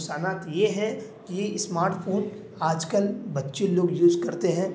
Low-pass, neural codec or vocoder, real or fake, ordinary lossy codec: none; none; real; none